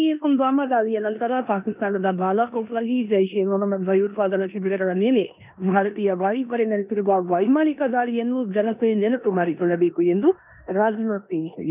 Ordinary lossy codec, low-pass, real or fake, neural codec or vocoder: none; 3.6 kHz; fake; codec, 16 kHz in and 24 kHz out, 0.9 kbps, LongCat-Audio-Codec, four codebook decoder